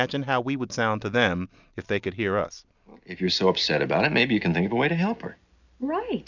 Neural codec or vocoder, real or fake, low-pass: none; real; 7.2 kHz